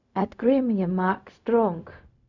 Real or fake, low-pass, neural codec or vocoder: fake; 7.2 kHz; codec, 16 kHz, 0.4 kbps, LongCat-Audio-Codec